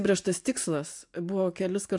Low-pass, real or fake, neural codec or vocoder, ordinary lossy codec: 10.8 kHz; real; none; MP3, 64 kbps